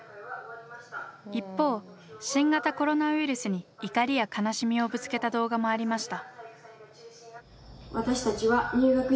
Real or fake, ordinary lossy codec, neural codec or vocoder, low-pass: real; none; none; none